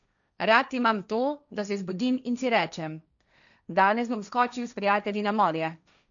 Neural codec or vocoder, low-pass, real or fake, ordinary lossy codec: codec, 16 kHz, 1.1 kbps, Voila-Tokenizer; 7.2 kHz; fake; none